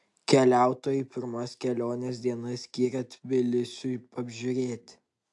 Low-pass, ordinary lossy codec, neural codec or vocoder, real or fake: 10.8 kHz; AAC, 64 kbps; none; real